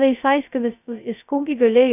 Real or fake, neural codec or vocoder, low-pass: fake; codec, 16 kHz, 0.2 kbps, FocalCodec; 3.6 kHz